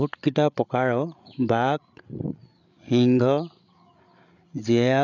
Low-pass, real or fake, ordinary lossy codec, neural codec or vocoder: 7.2 kHz; fake; none; codec, 16 kHz, 8 kbps, FreqCodec, larger model